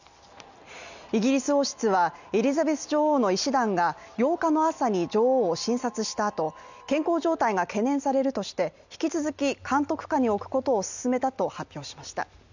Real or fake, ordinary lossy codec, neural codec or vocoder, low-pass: real; none; none; 7.2 kHz